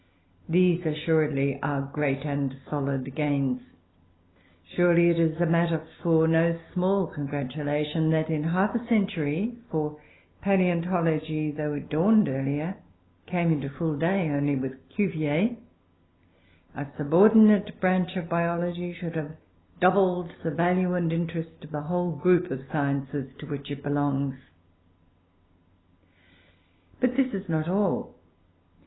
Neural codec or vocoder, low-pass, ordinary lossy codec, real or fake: none; 7.2 kHz; AAC, 16 kbps; real